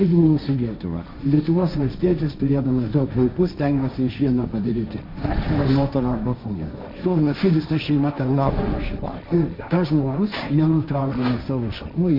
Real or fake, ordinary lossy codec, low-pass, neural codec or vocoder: fake; MP3, 48 kbps; 5.4 kHz; codec, 16 kHz, 1.1 kbps, Voila-Tokenizer